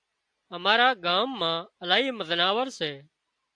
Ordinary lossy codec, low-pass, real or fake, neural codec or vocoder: AAC, 64 kbps; 9.9 kHz; real; none